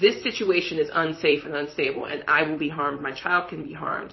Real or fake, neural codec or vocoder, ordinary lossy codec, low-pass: fake; vocoder, 44.1 kHz, 128 mel bands, Pupu-Vocoder; MP3, 24 kbps; 7.2 kHz